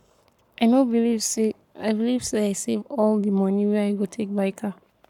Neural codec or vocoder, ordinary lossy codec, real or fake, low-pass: codec, 44.1 kHz, 7.8 kbps, Pupu-Codec; none; fake; 19.8 kHz